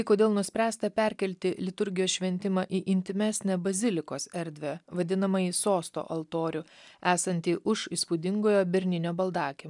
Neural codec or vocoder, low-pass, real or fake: none; 10.8 kHz; real